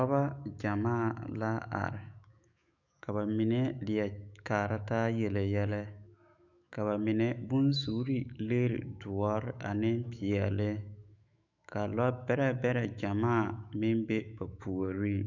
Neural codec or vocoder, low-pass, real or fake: autoencoder, 48 kHz, 128 numbers a frame, DAC-VAE, trained on Japanese speech; 7.2 kHz; fake